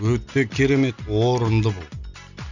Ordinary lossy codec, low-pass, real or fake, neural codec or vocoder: none; 7.2 kHz; real; none